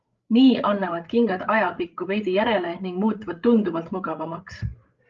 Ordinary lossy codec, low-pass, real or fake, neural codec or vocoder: Opus, 32 kbps; 7.2 kHz; fake; codec, 16 kHz, 8 kbps, FreqCodec, larger model